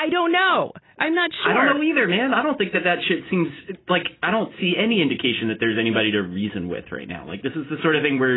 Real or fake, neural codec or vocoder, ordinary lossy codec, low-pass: real; none; AAC, 16 kbps; 7.2 kHz